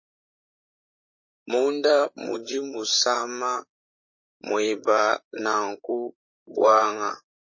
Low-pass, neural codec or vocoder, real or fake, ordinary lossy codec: 7.2 kHz; vocoder, 44.1 kHz, 128 mel bands, Pupu-Vocoder; fake; MP3, 32 kbps